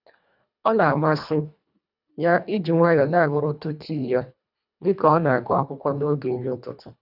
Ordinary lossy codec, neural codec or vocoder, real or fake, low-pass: none; codec, 24 kHz, 1.5 kbps, HILCodec; fake; 5.4 kHz